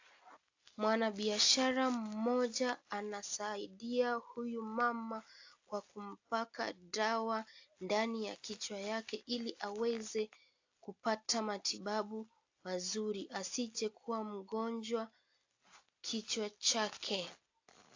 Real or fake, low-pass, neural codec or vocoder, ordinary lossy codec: real; 7.2 kHz; none; AAC, 48 kbps